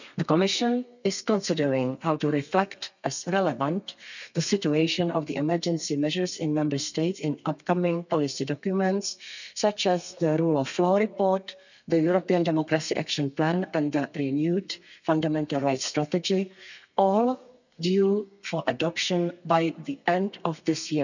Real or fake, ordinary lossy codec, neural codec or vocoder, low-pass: fake; none; codec, 32 kHz, 1.9 kbps, SNAC; 7.2 kHz